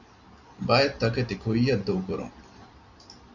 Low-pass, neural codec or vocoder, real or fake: 7.2 kHz; none; real